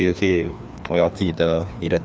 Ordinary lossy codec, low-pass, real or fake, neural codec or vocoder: none; none; fake; codec, 16 kHz, 2 kbps, FreqCodec, larger model